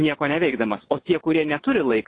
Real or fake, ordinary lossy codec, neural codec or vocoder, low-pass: fake; AAC, 32 kbps; vocoder, 22.05 kHz, 80 mel bands, WaveNeXt; 9.9 kHz